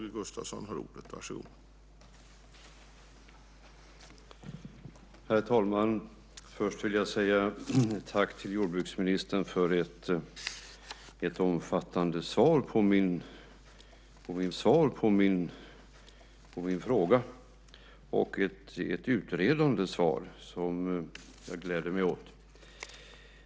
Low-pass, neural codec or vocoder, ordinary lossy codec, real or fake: none; none; none; real